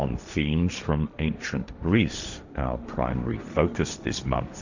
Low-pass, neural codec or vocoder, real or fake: 7.2 kHz; codec, 16 kHz, 1.1 kbps, Voila-Tokenizer; fake